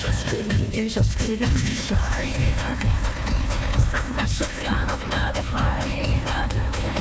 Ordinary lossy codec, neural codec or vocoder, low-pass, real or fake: none; codec, 16 kHz, 1 kbps, FunCodec, trained on Chinese and English, 50 frames a second; none; fake